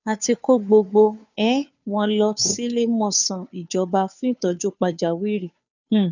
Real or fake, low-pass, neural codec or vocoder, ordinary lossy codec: fake; 7.2 kHz; codec, 16 kHz, 2 kbps, FunCodec, trained on Chinese and English, 25 frames a second; none